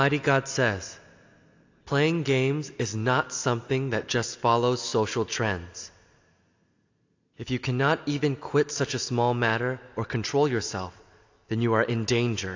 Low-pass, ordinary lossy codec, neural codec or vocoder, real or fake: 7.2 kHz; AAC, 48 kbps; none; real